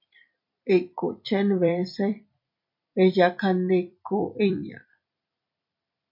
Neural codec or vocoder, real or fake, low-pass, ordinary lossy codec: vocoder, 44.1 kHz, 128 mel bands every 256 samples, BigVGAN v2; fake; 5.4 kHz; MP3, 48 kbps